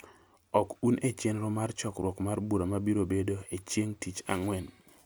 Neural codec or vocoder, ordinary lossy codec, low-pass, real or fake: none; none; none; real